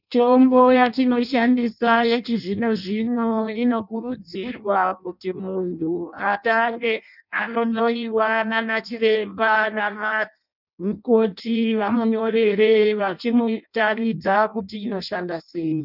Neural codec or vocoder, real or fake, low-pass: codec, 16 kHz in and 24 kHz out, 0.6 kbps, FireRedTTS-2 codec; fake; 5.4 kHz